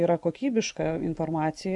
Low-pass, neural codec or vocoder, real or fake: 10.8 kHz; none; real